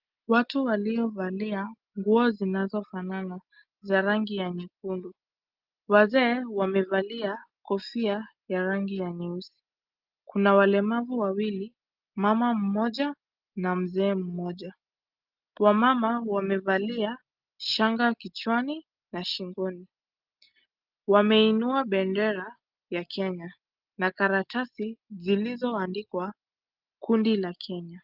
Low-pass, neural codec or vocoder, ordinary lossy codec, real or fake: 5.4 kHz; none; Opus, 32 kbps; real